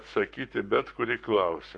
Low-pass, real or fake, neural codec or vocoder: 10.8 kHz; fake; codec, 44.1 kHz, 7.8 kbps, Pupu-Codec